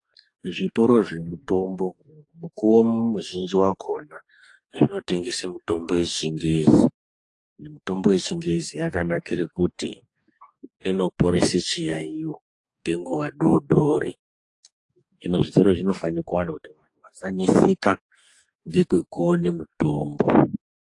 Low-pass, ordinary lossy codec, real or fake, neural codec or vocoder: 10.8 kHz; AAC, 48 kbps; fake; codec, 44.1 kHz, 2.6 kbps, DAC